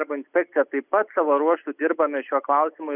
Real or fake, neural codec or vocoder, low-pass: real; none; 3.6 kHz